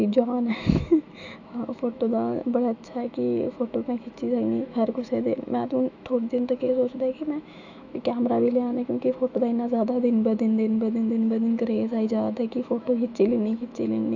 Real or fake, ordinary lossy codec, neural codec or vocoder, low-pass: fake; none; autoencoder, 48 kHz, 128 numbers a frame, DAC-VAE, trained on Japanese speech; 7.2 kHz